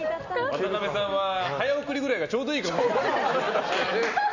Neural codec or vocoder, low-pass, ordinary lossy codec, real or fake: none; 7.2 kHz; none; real